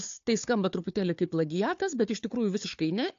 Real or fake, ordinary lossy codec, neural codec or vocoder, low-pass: fake; AAC, 48 kbps; codec, 16 kHz, 4 kbps, FunCodec, trained on Chinese and English, 50 frames a second; 7.2 kHz